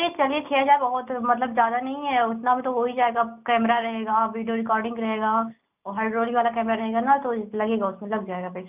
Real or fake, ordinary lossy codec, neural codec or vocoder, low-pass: real; none; none; 3.6 kHz